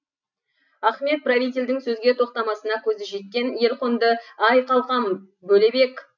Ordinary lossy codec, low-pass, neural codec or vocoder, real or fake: none; 7.2 kHz; none; real